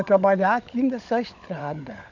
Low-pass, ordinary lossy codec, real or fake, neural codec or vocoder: 7.2 kHz; none; fake; codec, 16 kHz, 16 kbps, FunCodec, trained on LibriTTS, 50 frames a second